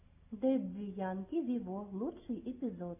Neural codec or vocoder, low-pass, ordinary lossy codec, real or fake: none; 3.6 kHz; MP3, 32 kbps; real